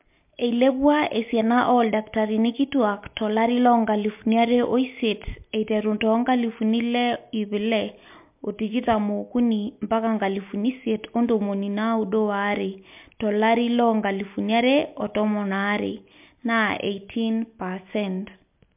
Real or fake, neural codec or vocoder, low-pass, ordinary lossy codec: real; none; 3.6 kHz; MP3, 32 kbps